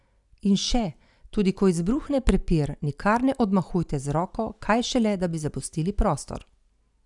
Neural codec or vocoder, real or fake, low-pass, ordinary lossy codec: none; real; 10.8 kHz; none